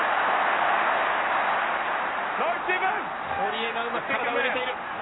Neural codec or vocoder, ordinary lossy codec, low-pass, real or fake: none; AAC, 16 kbps; 7.2 kHz; real